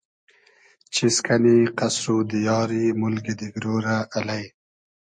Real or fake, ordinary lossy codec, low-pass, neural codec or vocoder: real; MP3, 48 kbps; 9.9 kHz; none